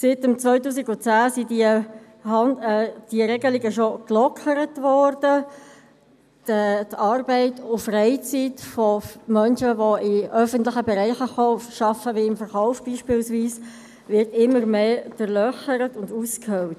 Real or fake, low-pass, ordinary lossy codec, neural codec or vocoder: real; 14.4 kHz; none; none